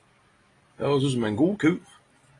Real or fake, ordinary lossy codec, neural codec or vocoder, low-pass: real; AAC, 32 kbps; none; 10.8 kHz